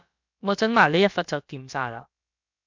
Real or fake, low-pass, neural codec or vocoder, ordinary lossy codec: fake; 7.2 kHz; codec, 16 kHz, about 1 kbps, DyCAST, with the encoder's durations; MP3, 64 kbps